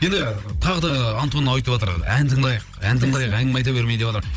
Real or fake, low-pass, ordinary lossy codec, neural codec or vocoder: fake; none; none; codec, 16 kHz, 16 kbps, FunCodec, trained on Chinese and English, 50 frames a second